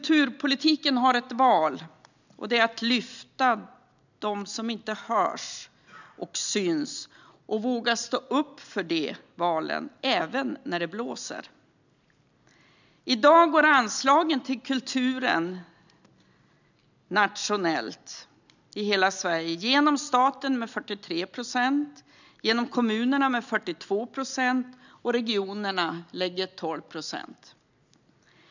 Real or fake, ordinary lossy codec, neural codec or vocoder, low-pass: real; none; none; 7.2 kHz